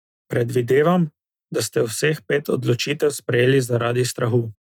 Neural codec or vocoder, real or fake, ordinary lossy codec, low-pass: none; real; none; 19.8 kHz